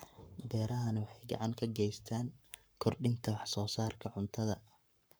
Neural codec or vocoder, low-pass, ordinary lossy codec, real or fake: codec, 44.1 kHz, 7.8 kbps, Pupu-Codec; none; none; fake